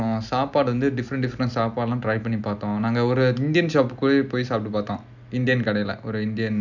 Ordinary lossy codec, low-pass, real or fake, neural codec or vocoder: none; 7.2 kHz; real; none